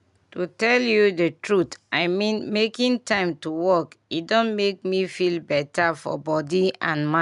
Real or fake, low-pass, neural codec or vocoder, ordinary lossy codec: real; 10.8 kHz; none; none